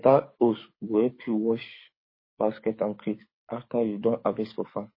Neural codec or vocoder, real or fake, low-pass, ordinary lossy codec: codec, 24 kHz, 6 kbps, HILCodec; fake; 5.4 kHz; MP3, 24 kbps